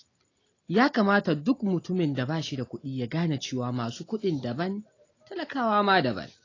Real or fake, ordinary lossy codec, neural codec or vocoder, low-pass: real; AAC, 32 kbps; none; 7.2 kHz